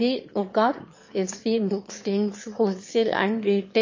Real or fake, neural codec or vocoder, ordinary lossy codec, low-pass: fake; autoencoder, 22.05 kHz, a latent of 192 numbers a frame, VITS, trained on one speaker; MP3, 32 kbps; 7.2 kHz